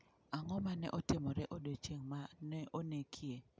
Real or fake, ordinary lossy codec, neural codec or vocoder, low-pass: real; none; none; none